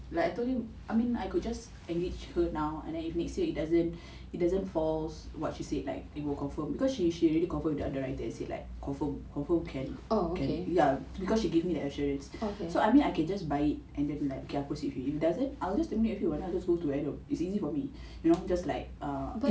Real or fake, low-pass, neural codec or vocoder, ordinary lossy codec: real; none; none; none